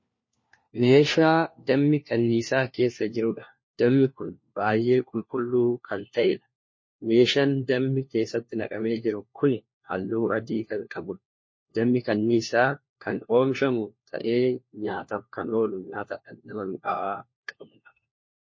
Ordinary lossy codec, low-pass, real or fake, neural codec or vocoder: MP3, 32 kbps; 7.2 kHz; fake; codec, 16 kHz, 1 kbps, FunCodec, trained on LibriTTS, 50 frames a second